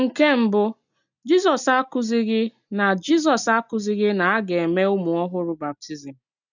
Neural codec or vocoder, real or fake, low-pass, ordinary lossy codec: none; real; 7.2 kHz; none